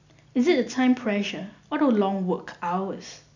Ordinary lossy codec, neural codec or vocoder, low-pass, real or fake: none; none; 7.2 kHz; real